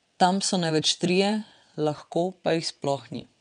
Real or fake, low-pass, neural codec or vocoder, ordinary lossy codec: fake; 9.9 kHz; vocoder, 22.05 kHz, 80 mel bands, WaveNeXt; none